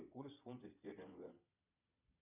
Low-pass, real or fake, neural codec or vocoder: 3.6 kHz; fake; vocoder, 22.05 kHz, 80 mel bands, Vocos